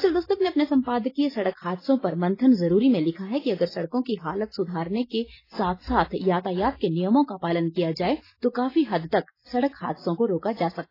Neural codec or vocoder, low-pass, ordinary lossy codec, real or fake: none; 5.4 kHz; AAC, 24 kbps; real